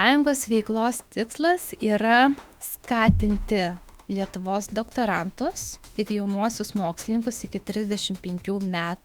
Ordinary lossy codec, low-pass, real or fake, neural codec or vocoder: Opus, 64 kbps; 19.8 kHz; fake; autoencoder, 48 kHz, 32 numbers a frame, DAC-VAE, trained on Japanese speech